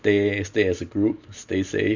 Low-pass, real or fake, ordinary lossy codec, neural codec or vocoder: 7.2 kHz; real; Opus, 64 kbps; none